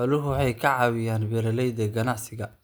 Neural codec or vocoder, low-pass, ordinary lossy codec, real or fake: none; none; none; real